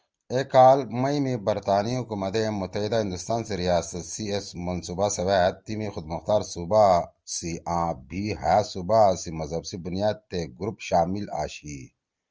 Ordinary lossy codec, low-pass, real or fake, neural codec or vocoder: Opus, 24 kbps; 7.2 kHz; real; none